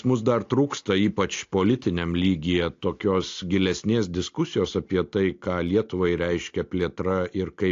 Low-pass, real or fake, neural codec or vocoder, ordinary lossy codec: 7.2 kHz; real; none; AAC, 48 kbps